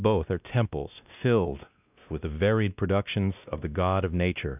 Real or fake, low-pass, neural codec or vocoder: fake; 3.6 kHz; codec, 16 kHz, 1 kbps, X-Codec, WavLM features, trained on Multilingual LibriSpeech